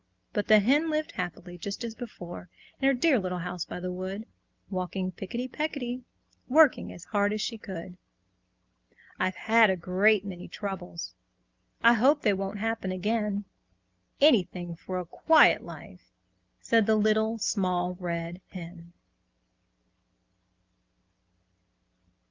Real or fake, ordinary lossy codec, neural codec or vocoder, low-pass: real; Opus, 24 kbps; none; 7.2 kHz